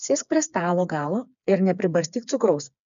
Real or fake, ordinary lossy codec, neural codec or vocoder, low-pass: fake; MP3, 96 kbps; codec, 16 kHz, 4 kbps, FreqCodec, smaller model; 7.2 kHz